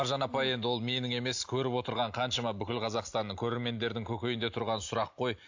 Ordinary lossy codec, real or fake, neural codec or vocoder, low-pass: AAC, 48 kbps; real; none; 7.2 kHz